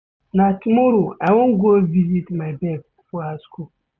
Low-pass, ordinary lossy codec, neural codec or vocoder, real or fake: none; none; none; real